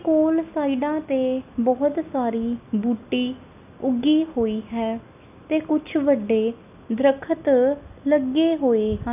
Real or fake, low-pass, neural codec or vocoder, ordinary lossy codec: real; 3.6 kHz; none; none